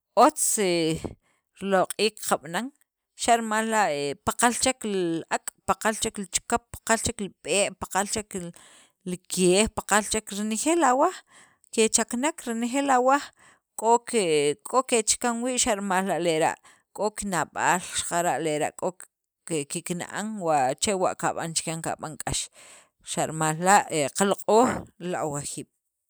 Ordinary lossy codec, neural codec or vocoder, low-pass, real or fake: none; none; none; real